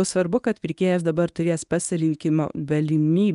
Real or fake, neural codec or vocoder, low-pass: fake; codec, 24 kHz, 0.9 kbps, WavTokenizer, medium speech release version 1; 10.8 kHz